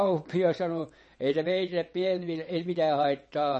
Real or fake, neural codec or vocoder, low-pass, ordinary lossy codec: fake; vocoder, 22.05 kHz, 80 mel bands, WaveNeXt; 9.9 kHz; MP3, 32 kbps